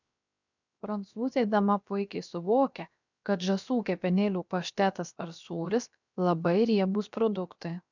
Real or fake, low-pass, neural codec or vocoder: fake; 7.2 kHz; codec, 16 kHz, 0.7 kbps, FocalCodec